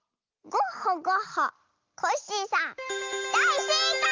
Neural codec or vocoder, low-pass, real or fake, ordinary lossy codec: none; 7.2 kHz; real; Opus, 32 kbps